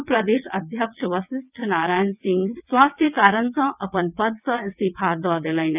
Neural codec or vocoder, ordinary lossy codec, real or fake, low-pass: vocoder, 22.05 kHz, 80 mel bands, WaveNeXt; none; fake; 3.6 kHz